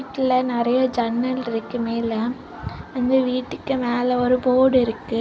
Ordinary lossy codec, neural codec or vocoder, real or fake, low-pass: none; none; real; none